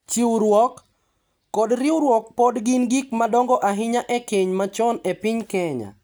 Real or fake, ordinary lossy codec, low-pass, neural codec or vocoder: real; none; none; none